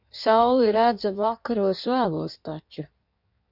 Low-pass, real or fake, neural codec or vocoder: 5.4 kHz; fake; codec, 16 kHz in and 24 kHz out, 1.1 kbps, FireRedTTS-2 codec